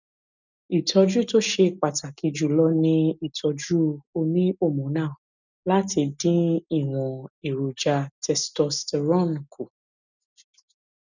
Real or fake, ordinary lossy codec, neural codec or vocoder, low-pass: real; none; none; 7.2 kHz